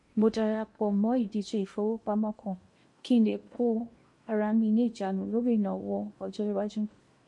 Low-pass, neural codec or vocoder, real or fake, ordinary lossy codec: 10.8 kHz; codec, 16 kHz in and 24 kHz out, 0.8 kbps, FocalCodec, streaming, 65536 codes; fake; MP3, 48 kbps